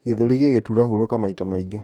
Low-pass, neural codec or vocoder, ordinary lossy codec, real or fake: 19.8 kHz; codec, 44.1 kHz, 2.6 kbps, DAC; none; fake